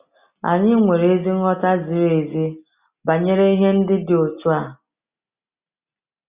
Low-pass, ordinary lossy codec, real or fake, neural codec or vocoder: 3.6 kHz; Opus, 64 kbps; real; none